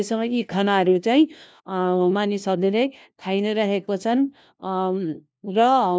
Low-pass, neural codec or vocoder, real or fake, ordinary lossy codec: none; codec, 16 kHz, 1 kbps, FunCodec, trained on LibriTTS, 50 frames a second; fake; none